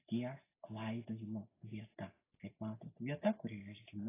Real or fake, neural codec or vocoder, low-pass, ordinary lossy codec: real; none; 3.6 kHz; AAC, 16 kbps